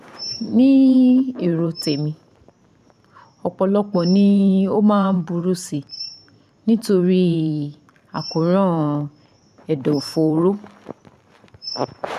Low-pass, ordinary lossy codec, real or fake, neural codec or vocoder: 14.4 kHz; none; fake; vocoder, 44.1 kHz, 128 mel bands every 512 samples, BigVGAN v2